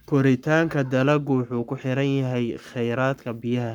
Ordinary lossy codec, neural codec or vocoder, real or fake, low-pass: none; codec, 44.1 kHz, 7.8 kbps, Pupu-Codec; fake; 19.8 kHz